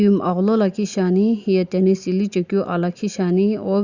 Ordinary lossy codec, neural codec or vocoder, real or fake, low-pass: Opus, 64 kbps; none; real; 7.2 kHz